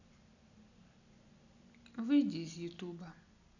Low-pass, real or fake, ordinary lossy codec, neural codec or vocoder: 7.2 kHz; real; none; none